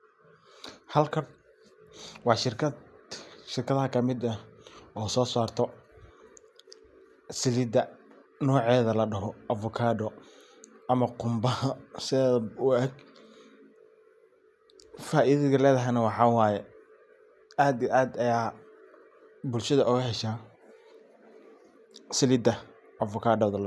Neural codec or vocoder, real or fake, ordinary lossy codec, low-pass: none; real; none; none